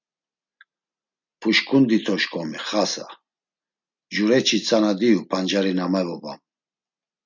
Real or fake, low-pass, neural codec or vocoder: real; 7.2 kHz; none